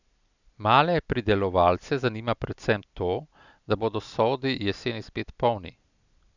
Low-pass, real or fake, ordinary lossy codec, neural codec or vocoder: 7.2 kHz; real; none; none